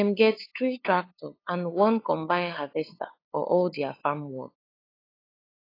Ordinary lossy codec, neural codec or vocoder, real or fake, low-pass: AAC, 24 kbps; codec, 16 kHz, 8 kbps, FunCodec, trained on LibriTTS, 25 frames a second; fake; 5.4 kHz